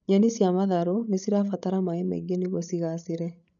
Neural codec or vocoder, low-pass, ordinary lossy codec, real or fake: codec, 16 kHz, 8 kbps, FreqCodec, larger model; 7.2 kHz; none; fake